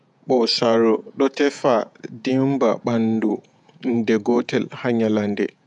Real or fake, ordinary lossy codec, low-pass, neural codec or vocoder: fake; none; 10.8 kHz; vocoder, 48 kHz, 128 mel bands, Vocos